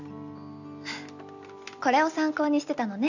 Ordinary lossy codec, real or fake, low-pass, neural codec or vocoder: none; real; 7.2 kHz; none